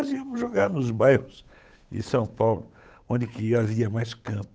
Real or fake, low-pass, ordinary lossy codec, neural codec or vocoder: fake; none; none; codec, 16 kHz, 8 kbps, FunCodec, trained on Chinese and English, 25 frames a second